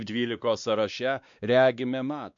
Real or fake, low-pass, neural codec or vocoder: fake; 7.2 kHz; codec, 16 kHz, 2 kbps, X-Codec, WavLM features, trained on Multilingual LibriSpeech